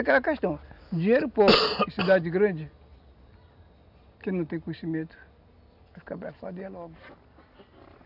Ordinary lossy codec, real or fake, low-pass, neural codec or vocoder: none; real; 5.4 kHz; none